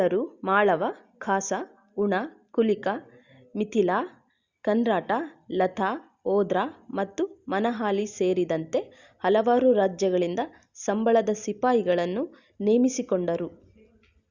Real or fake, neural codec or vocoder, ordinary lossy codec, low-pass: real; none; Opus, 64 kbps; 7.2 kHz